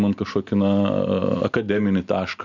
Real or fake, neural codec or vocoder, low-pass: real; none; 7.2 kHz